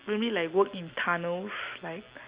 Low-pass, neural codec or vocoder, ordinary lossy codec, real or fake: 3.6 kHz; none; Opus, 64 kbps; real